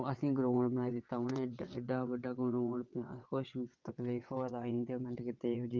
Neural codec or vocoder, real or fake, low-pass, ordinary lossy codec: vocoder, 22.05 kHz, 80 mel bands, WaveNeXt; fake; 7.2 kHz; Opus, 24 kbps